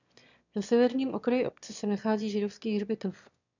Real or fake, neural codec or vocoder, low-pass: fake; autoencoder, 22.05 kHz, a latent of 192 numbers a frame, VITS, trained on one speaker; 7.2 kHz